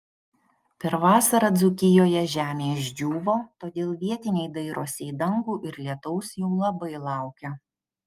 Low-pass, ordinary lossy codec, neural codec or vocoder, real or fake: 14.4 kHz; Opus, 32 kbps; none; real